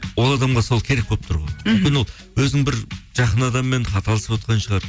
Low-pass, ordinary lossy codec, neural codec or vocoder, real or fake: none; none; none; real